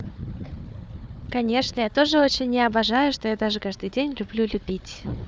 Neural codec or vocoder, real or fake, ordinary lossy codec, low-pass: codec, 16 kHz, 4 kbps, FunCodec, trained on Chinese and English, 50 frames a second; fake; none; none